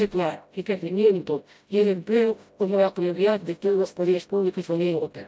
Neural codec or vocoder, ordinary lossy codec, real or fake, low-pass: codec, 16 kHz, 0.5 kbps, FreqCodec, smaller model; none; fake; none